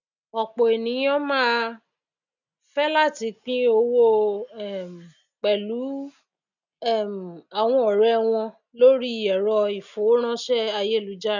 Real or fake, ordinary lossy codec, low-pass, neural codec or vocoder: real; none; 7.2 kHz; none